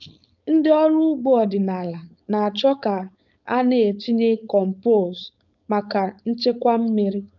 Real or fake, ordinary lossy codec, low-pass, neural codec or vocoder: fake; none; 7.2 kHz; codec, 16 kHz, 4.8 kbps, FACodec